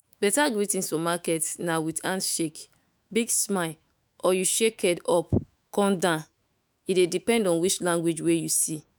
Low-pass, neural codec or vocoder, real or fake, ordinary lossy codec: none; autoencoder, 48 kHz, 128 numbers a frame, DAC-VAE, trained on Japanese speech; fake; none